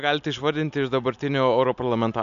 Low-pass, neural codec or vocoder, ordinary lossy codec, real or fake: 7.2 kHz; none; AAC, 96 kbps; real